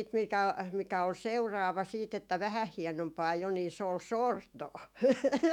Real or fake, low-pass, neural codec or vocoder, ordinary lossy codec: fake; 19.8 kHz; autoencoder, 48 kHz, 128 numbers a frame, DAC-VAE, trained on Japanese speech; none